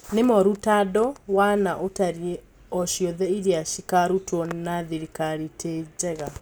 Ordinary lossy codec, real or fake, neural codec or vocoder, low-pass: none; real; none; none